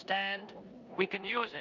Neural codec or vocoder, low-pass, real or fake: codec, 16 kHz in and 24 kHz out, 0.9 kbps, LongCat-Audio-Codec, four codebook decoder; 7.2 kHz; fake